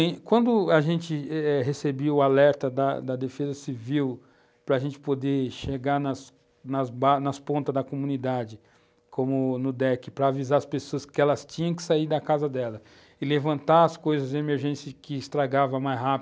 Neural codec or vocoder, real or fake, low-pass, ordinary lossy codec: none; real; none; none